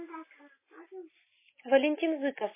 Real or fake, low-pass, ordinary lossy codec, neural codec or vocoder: real; 3.6 kHz; MP3, 16 kbps; none